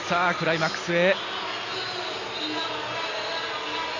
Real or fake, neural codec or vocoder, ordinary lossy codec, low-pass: real; none; none; 7.2 kHz